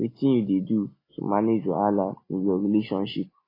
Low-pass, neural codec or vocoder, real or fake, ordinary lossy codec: 5.4 kHz; none; real; AAC, 24 kbps